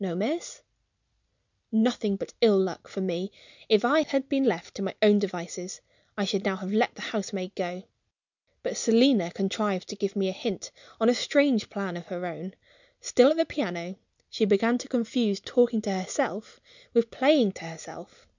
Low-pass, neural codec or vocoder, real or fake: 7.2 kHz; none; real